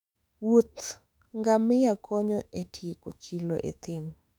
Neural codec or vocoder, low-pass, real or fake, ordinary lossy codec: autoencoder, 48 kHz, 32 numbers a frame, DAC-VAE, trained on Japanese speech; 19.8 kHz; fake; none